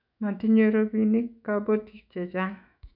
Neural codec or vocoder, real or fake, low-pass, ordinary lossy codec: autoencoder, 48 kHz, 128 numbers a frame, DAC-VAE, trained on Japanese speech; fake; 5.4 kHz; none